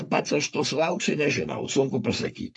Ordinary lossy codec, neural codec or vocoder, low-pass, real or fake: AAC, 64 kbps; codec, 44.1 kHz, 3.4 kbps, Pupu-Codec; 10.8 kHz; fake